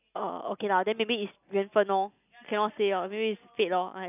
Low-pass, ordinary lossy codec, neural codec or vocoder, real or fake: 3.6 kHz; none; none; real